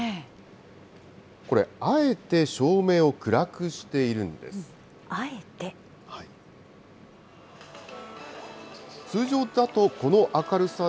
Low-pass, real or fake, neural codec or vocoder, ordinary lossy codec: none; real; none; none